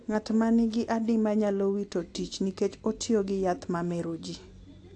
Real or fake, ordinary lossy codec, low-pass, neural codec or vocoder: real; AAC, 48 kbps; 10.8 kHz; none